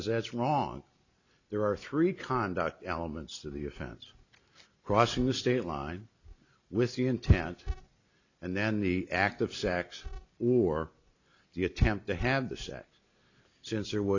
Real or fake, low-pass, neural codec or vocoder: real; 7.2 kHz; none